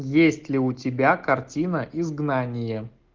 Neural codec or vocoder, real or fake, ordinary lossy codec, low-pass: none; real; Opus, 32 kbps; 7.2 kHz